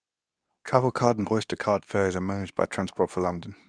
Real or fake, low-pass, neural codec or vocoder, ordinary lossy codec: fake; 9.9 kHz; codec, 24 kHz, 0.9 kbps, WavTokenizer, medium speech release version 2; none